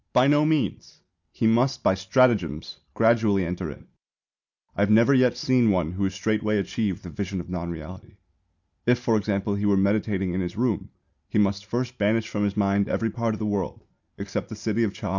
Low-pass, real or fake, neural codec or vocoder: 7.2 kHz; real; none